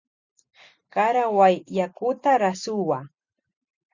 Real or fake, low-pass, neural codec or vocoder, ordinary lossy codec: real; 7.2 kHz; none; Opus, 64 kbps